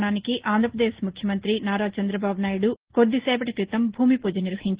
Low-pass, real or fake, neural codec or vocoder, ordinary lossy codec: 3.6 kHz; real; none; Opus, 16 kbps